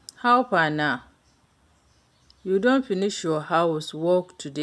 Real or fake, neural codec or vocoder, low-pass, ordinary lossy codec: real; none; none; none